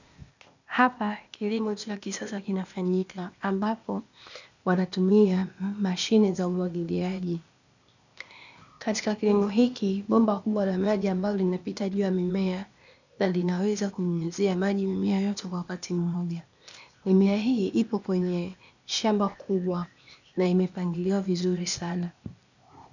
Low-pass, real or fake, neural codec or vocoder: 7.2 kHz; fake; codec, 16 kHz, 0.8 kbps, ZipCodec